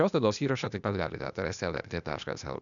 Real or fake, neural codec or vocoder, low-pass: fake; codec, 16 kHz, 0.8 kbps, ZipCodec; 7.2 kHz